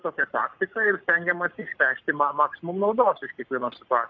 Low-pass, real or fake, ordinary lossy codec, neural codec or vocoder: 7.2 kHz; fake; MP3, 48 kbps; codec, 44.1 kHz, 7.8 kbps, Pupu-Codec